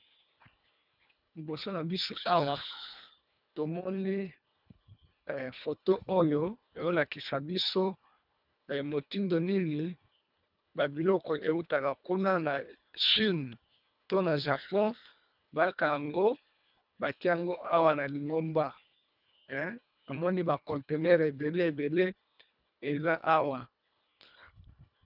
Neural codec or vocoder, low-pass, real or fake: codec, 24 kHz, 1.5 kbps, HILCodec; 5.4 kHz; fake